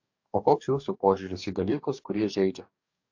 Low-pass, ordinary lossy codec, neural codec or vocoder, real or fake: 7.2 kHz; AAC, 48 kbps; codec, 44.1 kHz, 2.6 kbps, DAC; fake